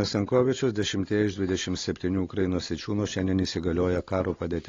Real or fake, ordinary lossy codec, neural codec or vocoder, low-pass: real; AAC, 32 kbps; none; 7.2 kHz